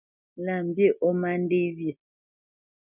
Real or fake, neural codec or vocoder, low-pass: real; none; 3.6 kHz